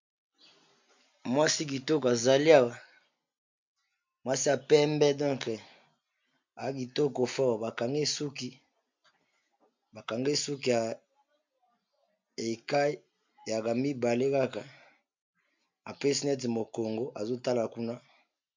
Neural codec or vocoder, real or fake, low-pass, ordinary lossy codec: none; real; 7.2 kHz; MP3, 64 kbps